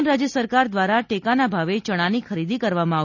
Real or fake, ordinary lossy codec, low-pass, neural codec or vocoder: real; none; 7.2 kHz; none